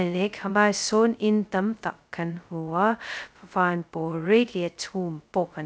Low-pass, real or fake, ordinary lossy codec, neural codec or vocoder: none; fake; none; codec, 16 kHz, 0.2 kbps, FocalCodec